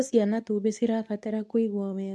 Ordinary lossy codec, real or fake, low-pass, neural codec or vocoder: none; fake; none; codec, 24 kHz, 0.9 kbps, WavTokenizer, medium speech release version 2